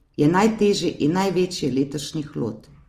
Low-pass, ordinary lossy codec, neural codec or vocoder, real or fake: 14.4 kHz; Opus, 24 kbps; none; real